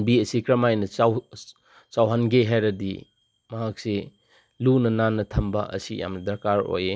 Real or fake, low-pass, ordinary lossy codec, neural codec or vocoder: real; none; none; none